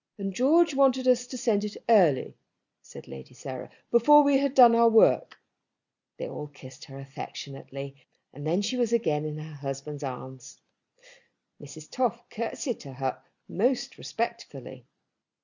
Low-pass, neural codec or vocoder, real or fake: 7.2 kHz; none; real